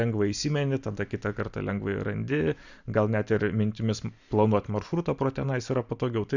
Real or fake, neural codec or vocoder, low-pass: real; none; 7.2 kHz